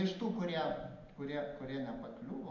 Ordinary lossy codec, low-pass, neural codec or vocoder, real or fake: MP3, 48 kbps; 7.2 kHz; none; real